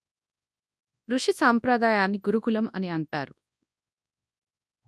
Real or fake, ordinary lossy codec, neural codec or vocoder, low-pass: fake; none; codec, 24 kHz, 0.9 kbps, WavTokenizer, large speech release; none